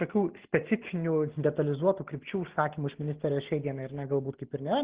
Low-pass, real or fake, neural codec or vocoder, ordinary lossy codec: 3.6 kHz; real; none; Opus, 16 kbps